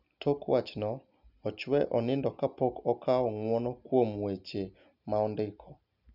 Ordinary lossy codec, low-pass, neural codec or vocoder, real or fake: none; 5.4 kHz; none; real